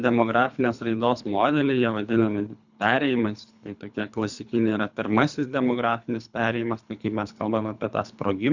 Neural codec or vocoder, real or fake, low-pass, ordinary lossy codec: codec, 24 kHz, 3 kbps, HILCodec; fake; 7.2 kHz; Opus, 64 kbps